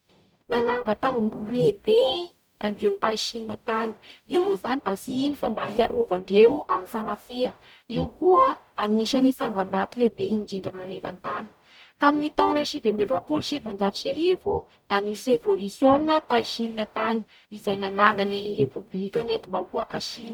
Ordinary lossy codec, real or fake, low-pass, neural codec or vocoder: none; fake; none; codec, 44.1 kHz, 0.9 kbps, DAC